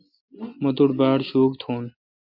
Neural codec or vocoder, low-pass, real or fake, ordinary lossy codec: none; 5.4 kHz; real; MP3, 48 kbps